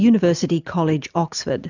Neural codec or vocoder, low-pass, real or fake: none; 7.2 kHz; real